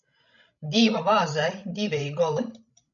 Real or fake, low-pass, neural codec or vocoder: fake; 7.2 kHz; codec, 16 kHz, 16 kbps, FreqCodec, larger model